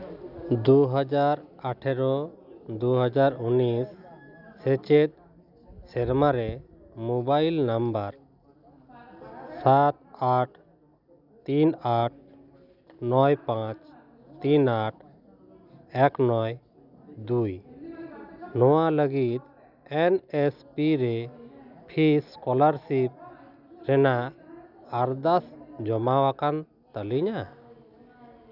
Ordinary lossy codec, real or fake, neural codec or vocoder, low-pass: none; real; none; 5.4 kHz